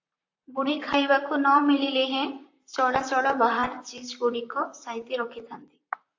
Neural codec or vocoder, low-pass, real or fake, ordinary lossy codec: vocoder, 44.1 kHz, 128 mel bands, Pupu-Vocoder; 7.2 kHz; fake; AAC, 48 kbps